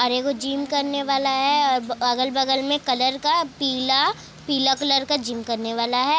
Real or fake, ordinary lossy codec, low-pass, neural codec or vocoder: real; none; none; none